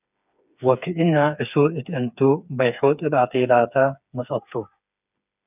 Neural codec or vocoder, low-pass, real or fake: codec, 16 kHz, 4 kbps, FreqCodec, smaller model; 3.6 kHz; fake